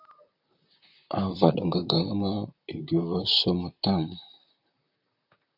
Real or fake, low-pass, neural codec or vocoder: fake; 5.4 kHz; vocoder, 44.1 kHz, 128 mel bands, Pupu-Vocoder